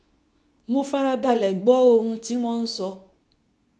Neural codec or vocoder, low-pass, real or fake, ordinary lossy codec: codec, 24 kHz, 0.9 kbps, WavTokenizer, medium speech release version 2; none; fake; none